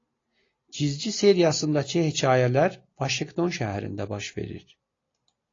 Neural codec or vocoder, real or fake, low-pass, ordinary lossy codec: none; real; 7.2 kHz; AAC, 32 kbps